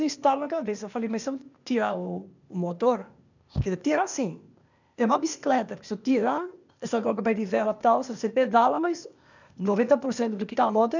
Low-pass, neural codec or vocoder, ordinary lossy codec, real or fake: 7.2 kHz; codec, 16 kHz, 0.8 kbps, ZipCodec; none; fake